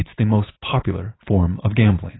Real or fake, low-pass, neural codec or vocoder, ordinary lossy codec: real; 7.2 kHz; none; AAC, 16 kbps